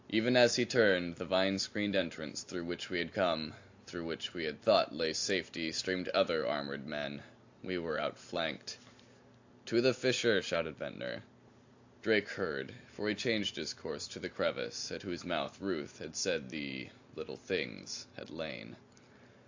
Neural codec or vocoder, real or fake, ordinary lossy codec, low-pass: none; real; AAC, 48 kbps; 7.2 kHz